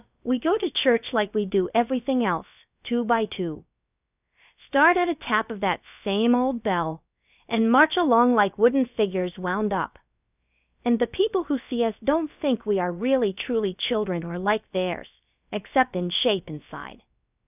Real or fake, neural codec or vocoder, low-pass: fake; codec, 16 kHz, about 1 kbps, DyCAST, with the encoder's durations; 3.6 kHz